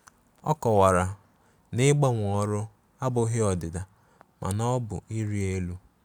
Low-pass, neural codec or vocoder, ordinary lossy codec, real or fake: none; none; none; real